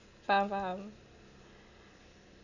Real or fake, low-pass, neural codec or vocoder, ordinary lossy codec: real; 7.2 kHz; none; none